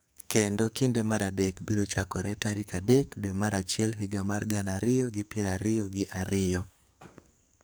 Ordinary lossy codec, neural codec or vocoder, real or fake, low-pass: none; codec, 44.1 kHz, 2.6 kbps, SNAC; fake; none